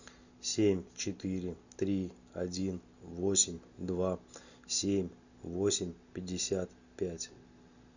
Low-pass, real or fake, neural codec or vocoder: 7.2 kHz; real; none